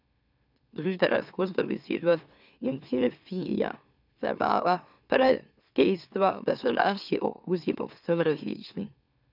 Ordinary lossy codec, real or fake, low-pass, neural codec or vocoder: none; fake; 5.4 kHz; autoencoder, 44.1 kHz, a latent of 192 numbers a frame, MeloTTS